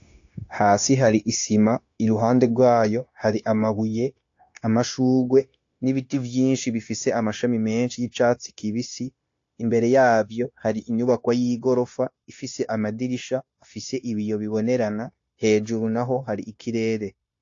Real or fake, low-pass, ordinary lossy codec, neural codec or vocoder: fake; 7.2 kHz; AAC, 48 kbps; codec, 16 kHz, 0.9 kbps, LongCat-Audio-Codec